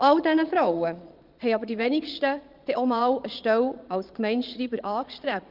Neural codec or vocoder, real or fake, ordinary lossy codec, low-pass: vocoder, 22.05 kHz, 80 mel bands, Vocos; fake; Opus, 24 kbps; 5.4 kHz